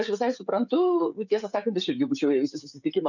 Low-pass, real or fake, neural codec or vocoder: 7.2 kHz; fake; codec, 16 kHz, 16 kbps, FreqCodec, smaller model